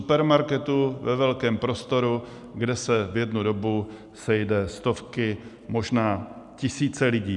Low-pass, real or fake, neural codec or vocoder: 10.8 kHz; real; none